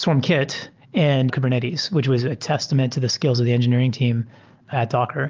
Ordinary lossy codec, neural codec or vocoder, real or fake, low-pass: Opus, 32 kbps; none; real; 7.2 kHz